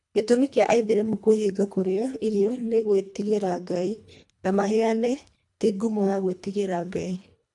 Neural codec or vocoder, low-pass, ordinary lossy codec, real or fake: codec, 24 kHz, 1.5 kbps, HILCodec; 10.8 kHz; AAC, 64 kbps; fake